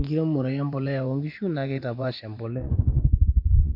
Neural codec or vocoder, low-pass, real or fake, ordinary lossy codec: vocoder, 24 kHz, 100 mel bands, Vocos; 5.4 kHz; fake; AAC, 48 kbps